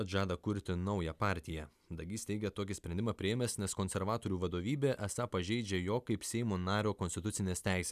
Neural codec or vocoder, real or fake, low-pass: none; real; 14.4 kHz